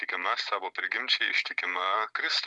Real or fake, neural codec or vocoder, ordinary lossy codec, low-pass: real; none; MP3, 96 kbps; 10.8 kHz